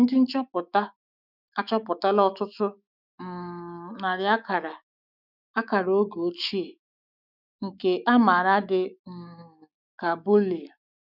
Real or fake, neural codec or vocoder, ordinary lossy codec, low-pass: fake; autoencoder, 48 kHz, 128 numbers a frame, DAC-VAE, trained on Japanese speech; none; 5.4 kHz